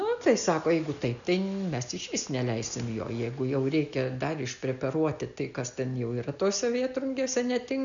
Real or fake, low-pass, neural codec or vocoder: real; 7.2 kHz; none